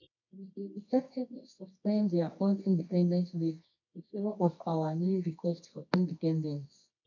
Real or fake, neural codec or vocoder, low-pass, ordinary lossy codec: fake; codec, 24 kHz, 0.9 kbps, WavTokenizer, medium music audio release; 7.2 kHz; none